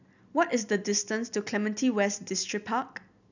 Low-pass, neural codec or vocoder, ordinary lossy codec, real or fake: 7.2 kHz; none; none; real